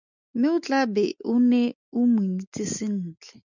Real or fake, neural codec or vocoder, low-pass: real; none; 7.2 kHz